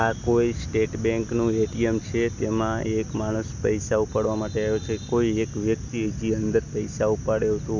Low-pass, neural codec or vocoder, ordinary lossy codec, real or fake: 7.2 kHz; none; none; real